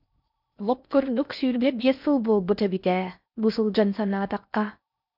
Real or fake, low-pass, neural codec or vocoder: fake; 5.4 kHz; codec, 16 kHz in and 24 kHz out, 0.6 kbps, FocalCodec, streaming, 4096 codes